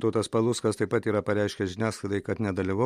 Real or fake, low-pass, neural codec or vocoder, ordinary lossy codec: real; 19.8 kHz; none; MP3, 64 kbps